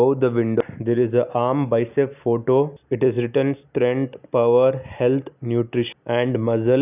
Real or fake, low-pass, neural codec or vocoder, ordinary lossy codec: real; 3.6 kHz; none; none